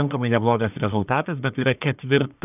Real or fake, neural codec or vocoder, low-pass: fake; codec, 44.1 kHz, 1.7 kbps, Pupu-Codec; 3.6 kHz